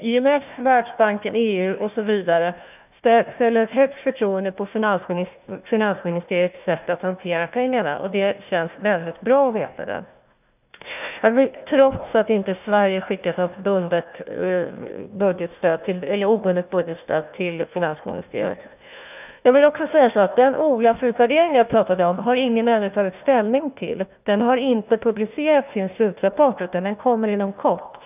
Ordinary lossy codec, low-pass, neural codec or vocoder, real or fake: none; 3.6 kHz; codec, 16 kHz, 1 kbps, FunCodec, trained on Chinese and English, 50 frames a second; fake